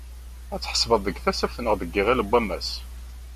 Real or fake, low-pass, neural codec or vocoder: real; 14.4 kHz; none